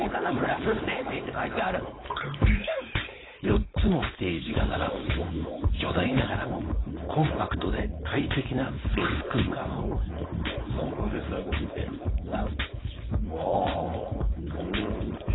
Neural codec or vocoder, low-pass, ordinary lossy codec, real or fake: codec, 16 kHz, 4.8 kbps, FACodec; 7.2 kHz; AAC, 16 kbps; fake